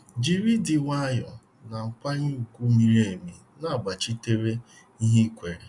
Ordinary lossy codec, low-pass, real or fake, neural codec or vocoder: none; 10.8 kHz; real; none